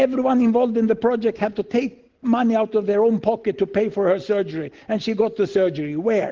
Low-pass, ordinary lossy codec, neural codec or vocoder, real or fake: 7.2 kHz; Opus, 16 kbps; none; real